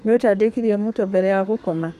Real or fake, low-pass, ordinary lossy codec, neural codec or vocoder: fake; 14.4 kHz; MP3, 96 kbps; codec, 32 kHz, 1.9 kbps, SNAC